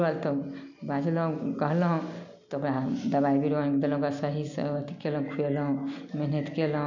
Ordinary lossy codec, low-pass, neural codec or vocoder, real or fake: none; 7.2 kHz; none; real